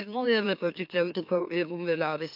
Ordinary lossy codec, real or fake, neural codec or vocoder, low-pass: MP3, 48 kbps; fake; autoencoder, 44.1 kHz, a latent of 192 numbers a frame, MeloTTS; 5.4 kHz